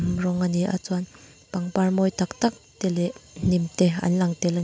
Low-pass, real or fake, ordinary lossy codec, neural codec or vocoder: none; real; none; none